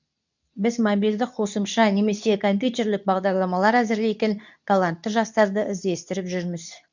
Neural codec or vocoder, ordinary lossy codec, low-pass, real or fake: codec, 24 kHz, 0.9 kbps, WavTokenizer, medium speech release version 2; none; 7.2 kHz; fake